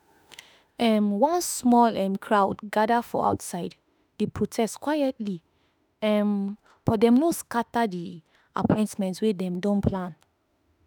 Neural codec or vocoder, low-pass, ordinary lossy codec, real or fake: autoencoder, 48 kHz, 32 numbers a frame, DAC-VAE, trained on Japanese speech; none; none; fake